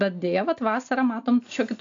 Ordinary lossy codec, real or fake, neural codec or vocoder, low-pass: AAC, 64 kbps; real; none; 7.2 kHz